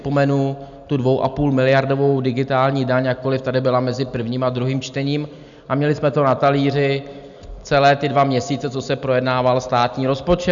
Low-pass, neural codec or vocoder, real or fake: 7.2 kHz; none; real